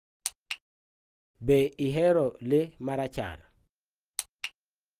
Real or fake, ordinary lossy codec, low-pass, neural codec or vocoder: real; Opus, 24 kbps; 14.4 kHz; none